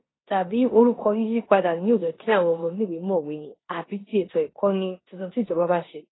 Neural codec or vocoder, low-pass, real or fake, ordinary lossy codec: codec, 16 kHz in and 24 kHz out, 0.9 kbps, LongCat-Audio-Codec, four codebook decoder; 7.2 kHz; fake; AAC, 16 kbps